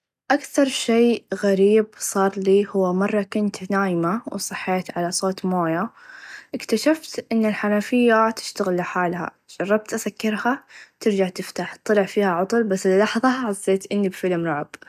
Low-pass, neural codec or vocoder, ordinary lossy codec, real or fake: 14.4 kHz; none; none; real